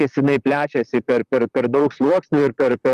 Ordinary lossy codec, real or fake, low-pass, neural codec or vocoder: Opus, 32 kbps; fake; 14.4 kHz; vocoder, 44.1 kHz, 128 mel bands, Pupu-Vocoder